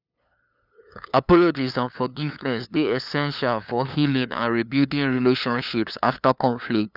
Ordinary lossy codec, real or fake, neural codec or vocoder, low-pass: none; fake; codec, 16 kHz, 2 kbps, FunCodec, trained on LibriTTS, 25 frames a second; 5.4 kHz